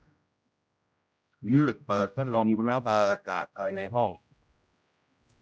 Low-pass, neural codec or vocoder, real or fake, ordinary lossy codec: none; codec, 16 kHz, 0.5 kbps, X-Codec, HuBERT features, trained on general audio; fake; none